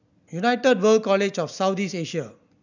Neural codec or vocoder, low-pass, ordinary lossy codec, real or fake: none; 7.2 kHz; none; real